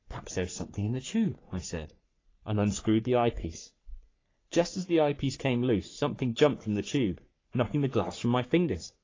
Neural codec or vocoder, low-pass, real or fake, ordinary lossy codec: codec, 44.1 kHz, 3.4 kbps, Pupu-Codec; 7.2 kHz; fake; AAC, 32 kbps